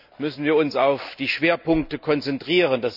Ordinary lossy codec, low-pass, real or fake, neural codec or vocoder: none; 5.4 kHz; real; none